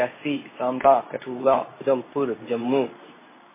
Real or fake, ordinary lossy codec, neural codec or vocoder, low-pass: fake; MP3, 16 kbps; codec, 24 kHz, 0.9 kbps, WavTokenizer, medium speech release version 2; 3.6 kHz